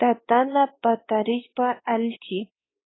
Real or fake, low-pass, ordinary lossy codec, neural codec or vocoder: fake; 7.2 kHz; AAC, 16 kbps; codec, 16 kHz, 2 kbps, X-Codec, WavLM features, trained on Multilingual LibriSpeech